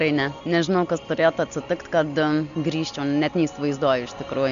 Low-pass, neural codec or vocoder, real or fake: 7.2 kHz; none; real